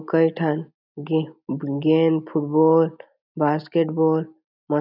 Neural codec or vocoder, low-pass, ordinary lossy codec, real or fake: none; 5.4 kHz; none; real